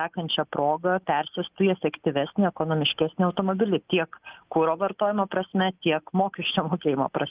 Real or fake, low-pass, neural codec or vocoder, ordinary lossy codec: real; 3.6 kHz; none; Opus, 32 kbps